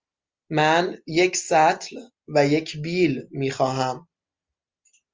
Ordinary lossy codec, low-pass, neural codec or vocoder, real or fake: Opus, 32 kbps; 7.2 kHz; none; real